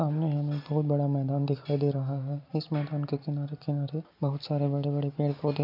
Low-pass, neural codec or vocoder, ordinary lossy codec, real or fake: 5.4 kHz; none; none; real